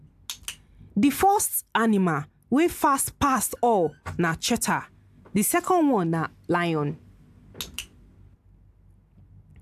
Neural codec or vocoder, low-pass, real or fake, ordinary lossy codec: none; 14.4 kHz; real; AAC, 96 kbps